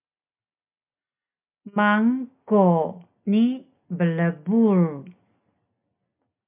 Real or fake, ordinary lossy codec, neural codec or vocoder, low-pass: real; MP3, 32 kbps; none; 3.6 kHz